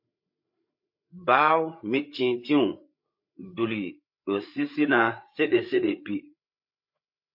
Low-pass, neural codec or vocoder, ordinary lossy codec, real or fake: 5.4 kHz; codec, 16 kHz, 8 kbps, FreqCodec, larger model; MP3, 32 kbps; fake